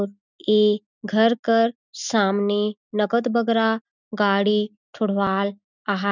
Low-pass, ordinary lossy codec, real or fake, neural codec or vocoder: 7.2 kHz; none; real; none